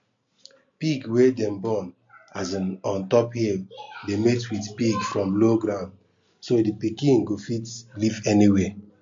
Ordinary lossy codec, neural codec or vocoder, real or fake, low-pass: MP3, 48 kbps; none; real; 7.2 kHz